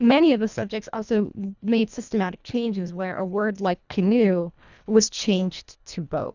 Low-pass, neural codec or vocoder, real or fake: 7.2 kHz; codec, 24 kHz, 1.5 kbps, HILCodec; fake